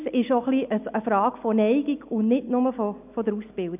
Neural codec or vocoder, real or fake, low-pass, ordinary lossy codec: none; real; 3.6 kHz; none